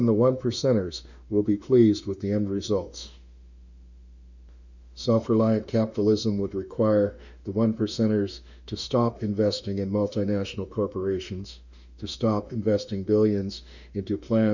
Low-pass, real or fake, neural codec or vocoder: 7.2 kHz; fake; autoencoder, 48 kHz, 32 numbers a frame, DAC-VAE, trained on Japanese speech